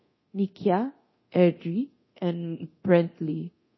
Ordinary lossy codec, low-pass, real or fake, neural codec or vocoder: MP3, 24 kbps; 7.2 kHz; fake; codec, 24 kHz, 0.9 kbps, DualCodec